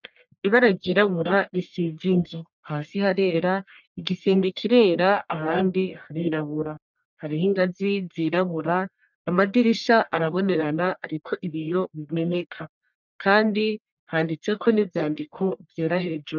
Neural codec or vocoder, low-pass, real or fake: codec, 44.1 kHz, 1.7 kbps, Pupu-Codec; 7.2 kHz; fake